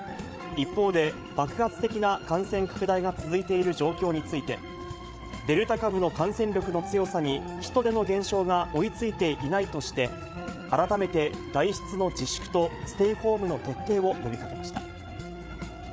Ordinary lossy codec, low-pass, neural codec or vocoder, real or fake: none; none; codec, 16 kHz, 8 kbps, FreqCodec, larger model; fake